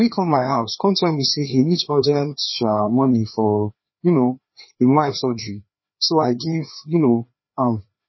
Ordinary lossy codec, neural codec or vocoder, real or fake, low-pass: MP3, 24 kbps; codec, 16 kHz, 2 kbps, FreqCodec, larger model; fake; 7.2 kHz